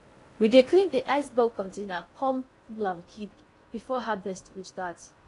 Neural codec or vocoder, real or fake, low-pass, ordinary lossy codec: codec, 16 kHz in and 24 kHz out, 0.6 kbps, FocalCodec, streaming, 4096 codes; fake; 10.8 kHz; AAC, 48 kbps